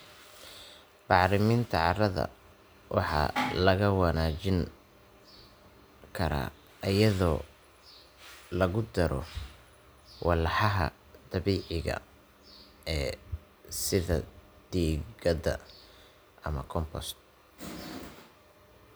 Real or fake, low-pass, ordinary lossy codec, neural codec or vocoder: real; none; none; none